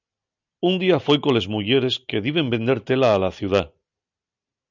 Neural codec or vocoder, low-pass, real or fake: none; 7.2 kHz; real